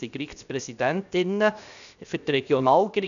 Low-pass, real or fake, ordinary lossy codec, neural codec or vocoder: 7.2 kHz; fake; none; codec, 16 kHz, 0.7 kbps, FocalCodec